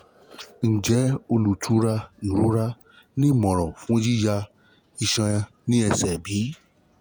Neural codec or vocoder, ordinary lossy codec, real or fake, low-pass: vocoder, 48 kHz, 128 mel bands, Vocos; none; fake; none